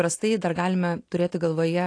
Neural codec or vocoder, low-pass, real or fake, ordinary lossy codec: none; 9.9 kHz; real; AAC, 48 kbps